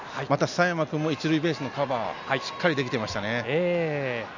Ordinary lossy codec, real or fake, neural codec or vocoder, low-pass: none; real; none; 7.2 kHz